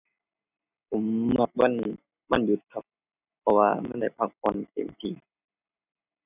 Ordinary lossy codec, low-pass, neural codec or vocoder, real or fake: none; 3.6 kHz; none; real